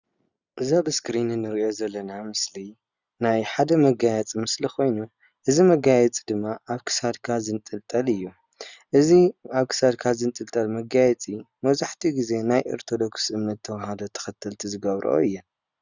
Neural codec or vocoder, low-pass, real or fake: none; 7.2 kHz; real